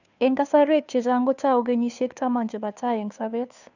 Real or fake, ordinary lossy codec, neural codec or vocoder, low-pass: fake; none; codec, 16 kHz, 2 kbps, FunCodec, trained on Chinese and English, 25 frames a second; 7.2 kHz